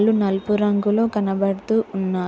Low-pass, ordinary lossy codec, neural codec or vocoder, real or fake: none; none; none; real